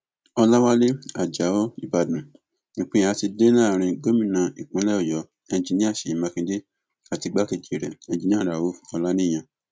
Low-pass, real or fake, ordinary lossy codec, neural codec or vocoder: none; real; none; none